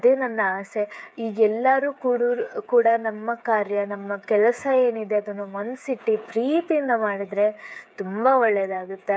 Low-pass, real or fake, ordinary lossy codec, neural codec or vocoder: none; fake; none; codec, 16 kHz, 8 kbps, FreqCodec, smaller model